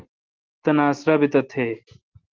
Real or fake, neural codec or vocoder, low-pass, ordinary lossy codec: real; none; 7.2 kHz; Opus, 16 kbps